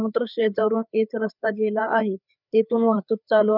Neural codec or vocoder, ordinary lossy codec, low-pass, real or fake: codec, 16 kHz, 4 kbps, FreqCodec, larger model; none; 5.4 kHz; fake